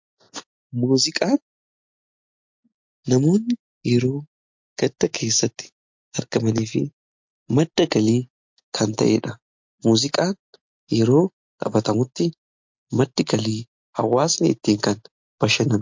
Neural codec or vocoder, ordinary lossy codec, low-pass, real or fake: none; MP3, 48 kbps; 7.2 kHz; real